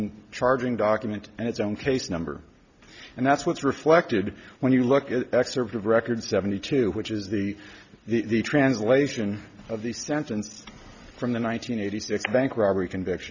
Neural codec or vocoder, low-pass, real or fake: none; 7.2 kHz; real